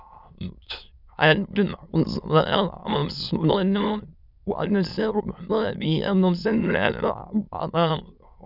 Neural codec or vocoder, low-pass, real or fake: autoencoder, 22.05 kHz, a latent of 192 numbers a frame, VITS, trained on many speakers; 5.4 kHz; fake